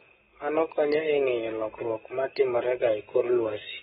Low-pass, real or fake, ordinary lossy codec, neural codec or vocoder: 19.8 kHz; fake; AAC, 16 kbps; codec, 44.1 kHz, 7.8 kbps, Pupu-Codec